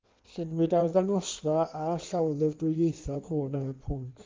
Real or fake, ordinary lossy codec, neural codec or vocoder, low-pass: fake; Opus, 24 kbps; codec, 16 kHz in and 24 kHz out, 1.1 kbps, FireRedTTS-2 codec; 7.2 kHz